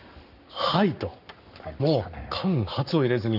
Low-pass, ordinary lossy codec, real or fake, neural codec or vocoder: 5.4 kHz; none; fake; codec, 44.1 kHz, 7.8 kbps, Pupu-Codec